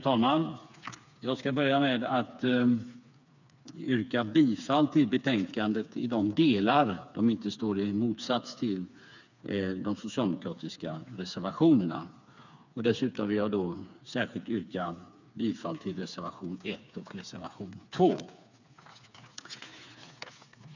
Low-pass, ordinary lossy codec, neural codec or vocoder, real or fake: 7.2 kHz; none; codec, 16 kHz, 4 kbps, FreqCodec, smaller model; fake